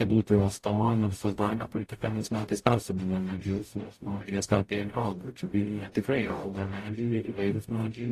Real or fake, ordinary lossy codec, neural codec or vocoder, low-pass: fake; AAC, 48 kbps; codec, 44.1 kHz, 0.9 kbps, DAC; 14.4 kHz